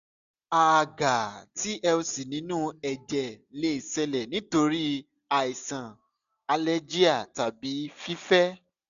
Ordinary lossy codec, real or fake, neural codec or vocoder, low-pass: none; real; none; 7.2 kHz